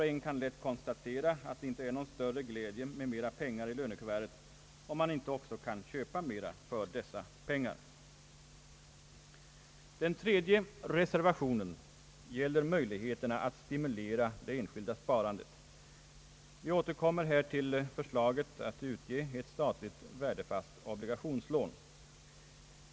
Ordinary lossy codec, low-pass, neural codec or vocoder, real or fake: none; none; none; real